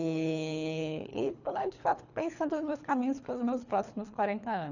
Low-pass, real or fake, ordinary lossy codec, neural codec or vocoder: 7.2 kHz; fake; none; codec, 24 kHz, 3 kbps, HILCodec